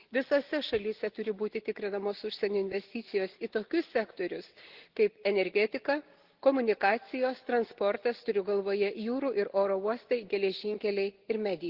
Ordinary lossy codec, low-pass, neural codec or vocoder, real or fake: Opus, 16 kbps; 5.4 kHz; none; real